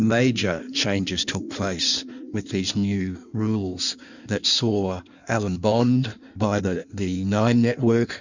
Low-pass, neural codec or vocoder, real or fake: 7.2 kHz; codec, 16 kHz in and 24 kHz out, 1.1 kbps, FireRedTTS-2 codec; fake